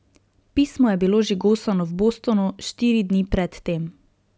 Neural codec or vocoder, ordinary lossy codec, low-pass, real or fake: none; none; none; real